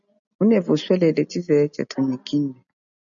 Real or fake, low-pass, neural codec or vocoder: real; 7.2 kHz; none